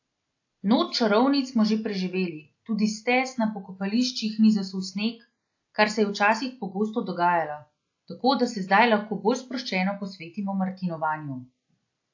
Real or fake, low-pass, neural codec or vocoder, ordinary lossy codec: real; 7.2 kHz; none; AAC, 48 kbps